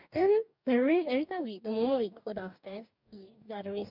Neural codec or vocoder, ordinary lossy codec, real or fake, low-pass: codec, 44.1 kHz, 2.6 kbps, DAC; none; fake; 5.4 kHz